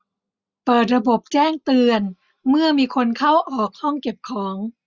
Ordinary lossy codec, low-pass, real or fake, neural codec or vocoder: Opus, 64 kbps; 7.2 kHz; real; none